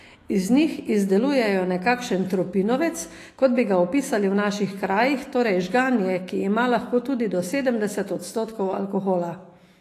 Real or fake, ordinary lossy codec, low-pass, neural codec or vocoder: fake; AAC, 48 kbps; 14.4 kHz; autoencoder, 48 kHz, 128 numbers a frame, DAC-VAE, trained on Japanese speech